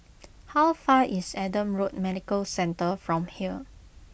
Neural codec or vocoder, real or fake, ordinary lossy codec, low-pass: none; real; none; none